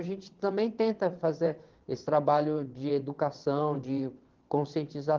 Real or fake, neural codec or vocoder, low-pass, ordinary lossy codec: fake; vocoder, 22.05 kHz, 80 mel bands, WaveNeXt; 7.2 kHz; Opus, 16 kbps